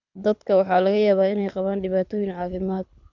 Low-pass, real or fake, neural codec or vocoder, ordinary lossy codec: 7.2 kHz; fake; codec, 24 kHz, 6 kbps, HILCodec; none